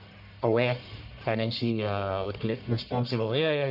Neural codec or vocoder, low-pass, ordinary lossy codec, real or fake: codec, 44.1 kHz, 1.7 kbps, Pupu-Codec; 5.4 kHz; none; fake